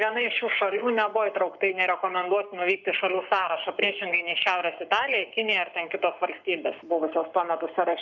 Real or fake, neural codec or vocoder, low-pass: fake; codec, 44.1 kHz, 7.8 kbps, Pupu-Codec; 7.2 kHz